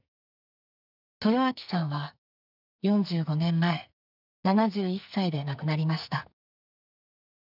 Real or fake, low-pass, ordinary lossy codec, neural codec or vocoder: fake; 5.4 kHz; none; codec, 44.1 kHz, 2.6 kbps, SNAC